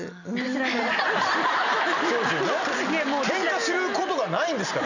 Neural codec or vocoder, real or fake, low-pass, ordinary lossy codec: none; real; 7.2 kHz; none